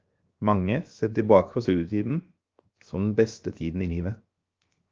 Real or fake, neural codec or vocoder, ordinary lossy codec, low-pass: fake; codec, 16 kHz, 0.7 kbps, FocalCodec; Opus, 24 kbps; 7.2 kHz